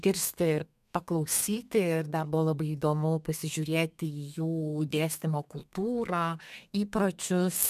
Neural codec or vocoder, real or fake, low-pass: codec, 32 kHz, 1.9 kbps, SNAC; fake; 14.4 kHz